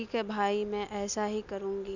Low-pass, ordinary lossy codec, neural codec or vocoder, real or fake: 7.2 kHz; none; none; real